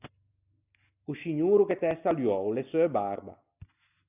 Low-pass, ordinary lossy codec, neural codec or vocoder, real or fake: 3.6 kHz; AAC, 32 kbps; none; real